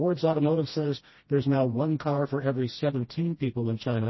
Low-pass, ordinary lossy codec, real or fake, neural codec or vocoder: 7.2 kHz; MP3, 24 kbps; fake; codec, 16 kHz, 1 kbps, FreqCodec, smaller model